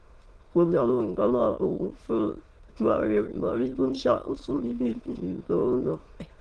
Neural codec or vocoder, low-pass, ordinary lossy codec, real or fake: autoencoder, 22.05 kHz, a latent of 192 numbers a frame, VITS, trained on many speakers; 9.9 kHz; Opus, 16 kbps; fake